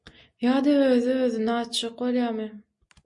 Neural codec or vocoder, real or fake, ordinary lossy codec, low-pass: none; real; MP3, 48 kbps; 10.8 kHz